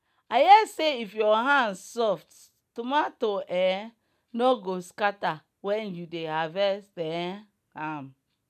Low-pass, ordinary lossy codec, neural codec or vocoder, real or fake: 14.4 kHz; none; none; real